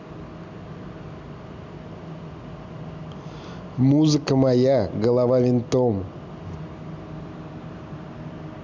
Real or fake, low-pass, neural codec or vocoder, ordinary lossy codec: real; 7.2 kHz; none; none